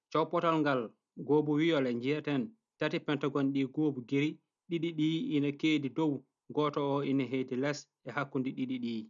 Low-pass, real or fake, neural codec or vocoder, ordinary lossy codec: 7.2 kHz; real; none; none